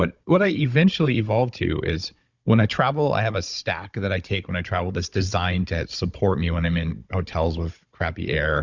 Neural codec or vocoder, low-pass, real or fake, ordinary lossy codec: codec, 16 kHz, 16 kbps, FunCodec, trained on Chinese and English, 50 frames a second; 7.2 kHz; fake; Opus, 64 kbps